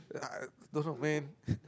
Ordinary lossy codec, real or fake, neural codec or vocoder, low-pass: none; real; none; none